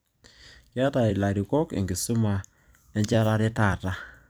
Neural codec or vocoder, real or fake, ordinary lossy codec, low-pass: vocoder, 44.1 kHz, 128 mel bands every 256 samples, BigVGAN v2; fake; none; none